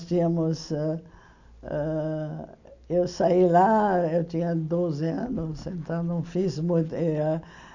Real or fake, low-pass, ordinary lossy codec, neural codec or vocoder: real; 7.2 kHz; none; none